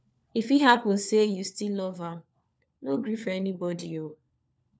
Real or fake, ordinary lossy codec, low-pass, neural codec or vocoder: fake; none; none; codec, 16 kHz, 4 kbps, FunCodec, trained on LibriTTS, 50 frames a second